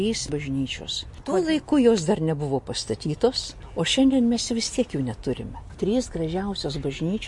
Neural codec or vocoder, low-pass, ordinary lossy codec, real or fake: none; 10.8 kHz; MP3, 48 kbps; real